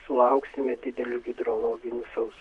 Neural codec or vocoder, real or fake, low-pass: vocoder, 44.1 kHz, 128 mel bands, Pupu-Vocoder; fake; 10.8 kHz